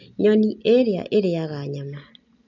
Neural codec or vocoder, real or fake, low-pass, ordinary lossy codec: none; real; 7.2 kHz; none